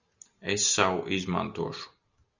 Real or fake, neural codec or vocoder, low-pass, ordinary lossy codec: real; none; 7.2 kHz; Opus, 64 kbps